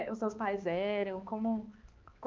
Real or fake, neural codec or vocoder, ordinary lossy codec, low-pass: fake; codec, 16 kHz, 2 kbps, X-Codec, HuBERT features, trained on balanced general audio; Opus, 32 kbps; 7.2 kHz